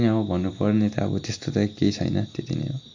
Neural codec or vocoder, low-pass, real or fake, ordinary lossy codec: none; 7.2 kHz; real; AAC, 48 kbps